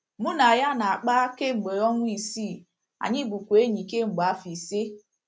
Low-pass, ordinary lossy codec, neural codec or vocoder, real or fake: none; none; none; real